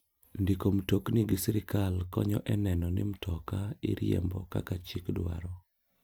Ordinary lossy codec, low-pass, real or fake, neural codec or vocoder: none; none; real; none